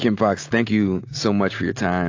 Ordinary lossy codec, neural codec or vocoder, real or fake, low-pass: AAC, 48 kbps; none; real; 7.2 kHz